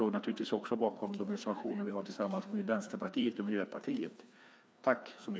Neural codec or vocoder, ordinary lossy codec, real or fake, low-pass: codec, 16 kHz, 2 kbps, FreqCodec, larger model; none; fake; none